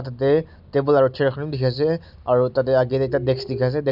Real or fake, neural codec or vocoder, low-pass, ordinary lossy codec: real; none; 5.4 kHz; none